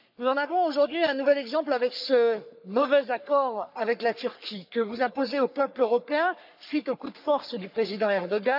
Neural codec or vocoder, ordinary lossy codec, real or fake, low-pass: codec, 44.1 kHz, 3.4 kbps, Pupu-Codec; none; fake; 5.4 kHz